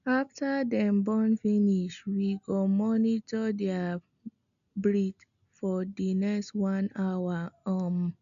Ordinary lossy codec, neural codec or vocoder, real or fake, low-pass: none; none; real; 7.2 kHz